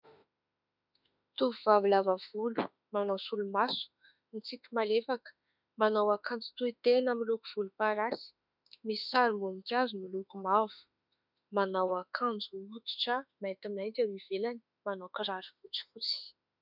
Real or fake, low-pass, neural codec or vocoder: fake; 5.4 kHz; autoencoder, 48 kHz, 32 numbers a frame, DAC-VAE, trained on Japanese speech